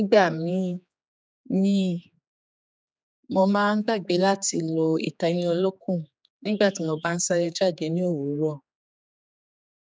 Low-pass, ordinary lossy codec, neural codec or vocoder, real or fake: none; none; codec, 16 kHz, 4 kbps, X-Codec, HuBERT features, trained on general audio; fake